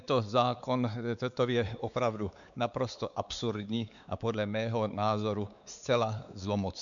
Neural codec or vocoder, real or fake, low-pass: codec, 16 kHz, 4 kbps, X-Codec, WavLM features, trained on Multilingual LibriSpeech; fake; 7.2 kHz